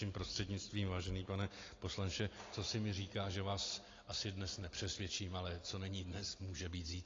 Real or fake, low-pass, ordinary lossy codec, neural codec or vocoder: real; 7.2 kHz; AAC, 32 kbps; none